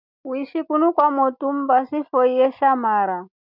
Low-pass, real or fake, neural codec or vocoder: 5.4 kHz; real; none